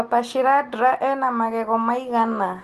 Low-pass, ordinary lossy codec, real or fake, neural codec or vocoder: 14.4 kHz; Opus, 24 kbps; real; none